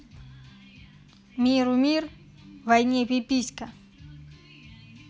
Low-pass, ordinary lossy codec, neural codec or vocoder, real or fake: none; none; none; real